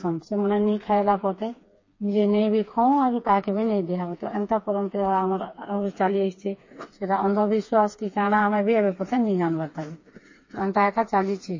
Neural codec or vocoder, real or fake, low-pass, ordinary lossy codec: codec, 16 kHz, 4 kbps, FreqCodec, smaller model; fake; 7.2 kHz; MP3, 32 kbps